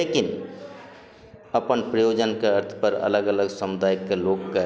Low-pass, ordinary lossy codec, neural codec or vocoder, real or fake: none; none; none; real